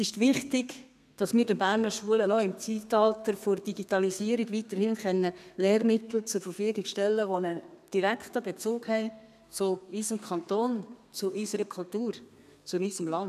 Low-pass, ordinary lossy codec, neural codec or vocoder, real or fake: 14.4 kHz; none; codec, 32 kHz, 1.9 kbps, SNAC; fake